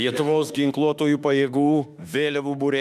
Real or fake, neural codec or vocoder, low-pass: fake; autoencoder, 48 kHz, 32 numbers a frame, DAC-VAE, trained on Japanese speech; 14.4 kHz